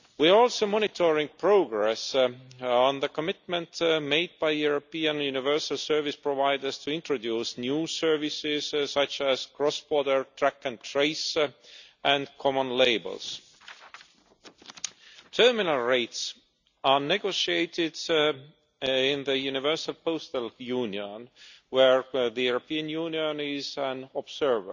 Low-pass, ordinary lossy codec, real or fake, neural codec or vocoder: 7.2 kHz; none; real; none